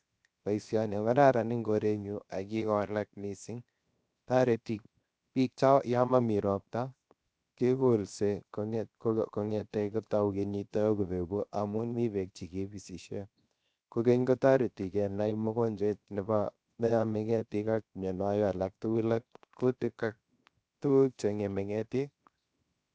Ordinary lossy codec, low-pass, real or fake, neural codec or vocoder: none; none; fake; codec, 16 kHz, 0.7 kbps, FocalCodec